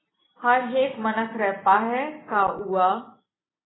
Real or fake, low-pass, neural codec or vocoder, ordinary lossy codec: real; 7.2 kHz; none; AAC, 16 kbps